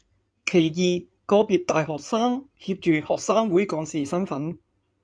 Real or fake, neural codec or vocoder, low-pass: fake; codec, 16 kHz in and 24 kHz out, 2.2 kbps, FireRedTTS-2 codec; 9.9 kHz